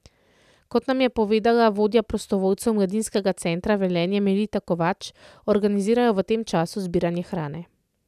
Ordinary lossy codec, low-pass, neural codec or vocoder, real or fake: none; 14.4 kHz; none; real